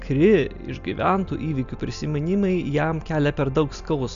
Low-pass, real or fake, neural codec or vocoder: 7.2 kHz; real; none